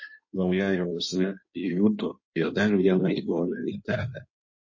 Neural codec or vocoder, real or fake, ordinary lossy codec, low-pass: codec, 16 kHz in and 24 kHz out, 1.1 kbps, FireRedTTS-2 codec; fake; MP3, 32 kbps; 7.2 kHz